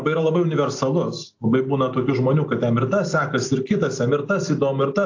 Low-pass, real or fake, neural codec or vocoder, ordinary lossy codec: 7.2 kHz; real; none; AAC, 48 kbps